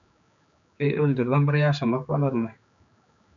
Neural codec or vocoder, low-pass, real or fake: codec, 16 kHz, 4 kbps, X-Codec, HuBERT features, trained on general audio; 7.2 kHz; fake